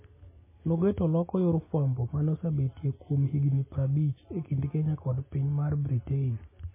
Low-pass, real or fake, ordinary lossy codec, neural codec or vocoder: 3.6 kHz; real; MP3, 16 kbps; none